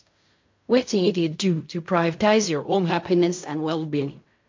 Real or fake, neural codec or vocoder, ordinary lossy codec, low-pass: fake; codec, 16 kHz in and 24 kHz out, 0.4 kbps, LongCat-Audio-Codec, fine tuned four codebook decoder; MP3, 48 kbps; 7.2 kHz